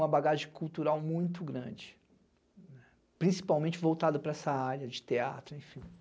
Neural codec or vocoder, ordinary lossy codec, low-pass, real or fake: none; none; none; real